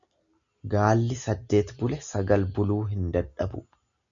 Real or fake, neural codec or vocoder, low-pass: real; none; 7.2 kHz